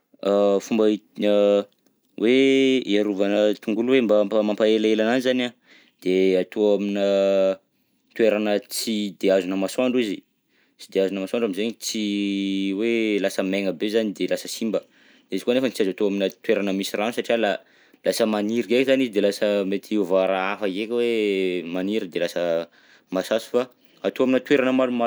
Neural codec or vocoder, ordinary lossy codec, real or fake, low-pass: none; none; real; none